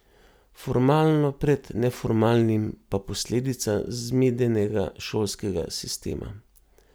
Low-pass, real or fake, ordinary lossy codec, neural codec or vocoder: none; real; none; none